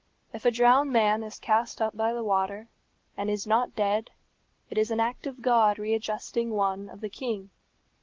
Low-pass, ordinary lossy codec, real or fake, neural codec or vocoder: 7.2 kHz; Opus, 16 kbps; real; none